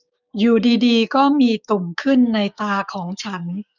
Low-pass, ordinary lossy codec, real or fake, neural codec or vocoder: 7.2 kHz; none; fake; codec, 16 kHz, 6 kbps, DAC